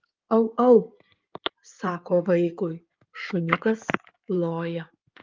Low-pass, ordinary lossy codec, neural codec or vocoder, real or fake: 7.2 kHz; Opus, 24 kbps; codec, 16 kHz in and 24 kHz out, 2.2 kbps, FireRedTTS-2 codec; fake